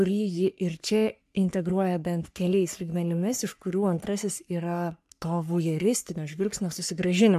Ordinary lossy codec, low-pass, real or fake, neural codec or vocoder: AAC, 96 kbps; 14.4 kHz; fake; codec, 44.1 kHz, 3.4 kbps, Pupu-Codec